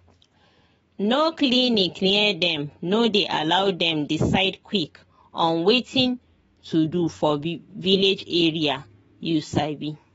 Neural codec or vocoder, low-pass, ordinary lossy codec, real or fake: autoencoder, 48 kHz, 128 numbers a frame, DAC-VAE, trained on Japanese speech; 19.8 kHz; AAC, 24 kbps; fake